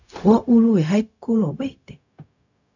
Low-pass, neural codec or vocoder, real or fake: 7.2 kHz; codec, 16 kHz, 0.4 kbps, LongCat-Audio-Codec; fake